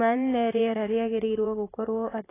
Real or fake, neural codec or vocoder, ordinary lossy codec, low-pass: fake; vocoder, 44.1 kHz, 128 mel bands, Pupu-Vocoder; AAC, 16 kbps; 3.6 kHz